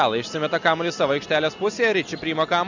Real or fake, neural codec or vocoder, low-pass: real; none; 7.2 kHz